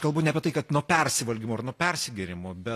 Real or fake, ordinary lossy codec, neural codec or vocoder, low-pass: real; AAC, 48 kbps; none; 14.4 kHz